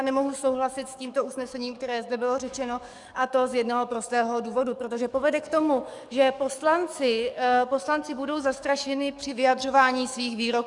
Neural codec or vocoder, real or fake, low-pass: codec, 44.1 kHz, 7.8 kbps, DAC; fake; 10.8 kHz